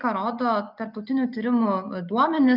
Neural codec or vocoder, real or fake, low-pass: none; real; 5.4 kHz